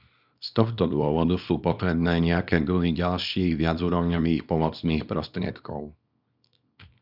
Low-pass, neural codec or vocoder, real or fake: 5.4 kHz; codec, 24 kHz, 0.9 kbps, WavTokenizer, small release; fake